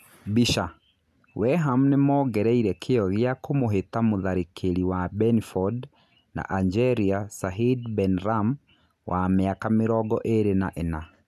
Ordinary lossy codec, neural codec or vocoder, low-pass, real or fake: none; none; 14.4 kHz; real